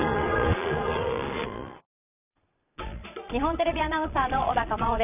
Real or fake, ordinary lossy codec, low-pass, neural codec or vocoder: fake; none; 3.6 kHz; vocoder, 22.05 kHz, 80 mel bands, Vocos